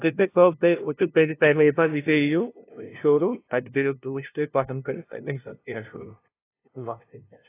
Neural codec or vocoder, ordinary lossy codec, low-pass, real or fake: codec, 16 kHz, 0.5 kbps, FunCodec, trained on LibriTTS, 25 frames a second; AAC, 24 kbps; 3.6 kHz; fake